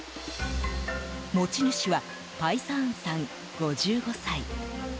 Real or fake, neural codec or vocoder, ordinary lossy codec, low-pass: real; none; none; none